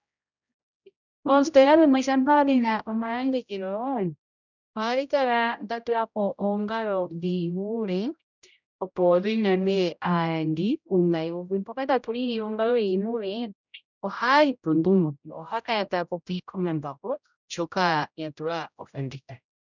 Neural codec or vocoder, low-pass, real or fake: codec, 16 kHz, 0.5 kbps, X-Codec, HuBERT features, trained on general audio; 7.2 kHz; fake